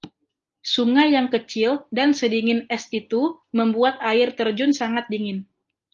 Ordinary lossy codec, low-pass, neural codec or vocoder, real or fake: Opus, 32 kbps; 7.2 kHz; none; real